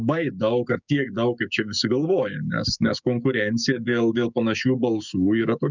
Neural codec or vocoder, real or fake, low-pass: codec, 16 kHz, 6 kbps, DAC; fake; 7.2 kHz